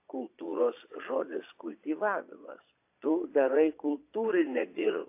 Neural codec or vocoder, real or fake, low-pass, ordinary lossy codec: vocoder, 22.05 kHz, 80 mel bands, Vocos; fake; 3.6 kHz; AAC, 24 kbps